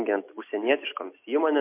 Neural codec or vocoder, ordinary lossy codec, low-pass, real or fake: none; MP3, 32 kbps; 3.6 kHz; real